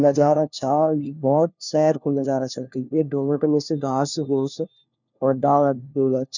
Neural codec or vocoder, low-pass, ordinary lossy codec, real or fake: codec, 16 kHz, 1 kbps, FunCodec, trained on LibriTTS, 50 frames a second; 7.2 kHz; none; fake